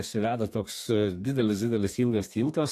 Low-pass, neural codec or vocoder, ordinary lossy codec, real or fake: 14.4 kHz; codec, 32 kHz, 1.9 kbps, SNAC; AAC, 64 kbps; fake